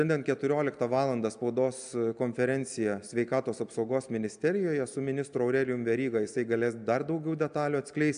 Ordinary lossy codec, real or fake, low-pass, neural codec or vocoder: AAC, 96 kbps; real; 9.9 kHz; none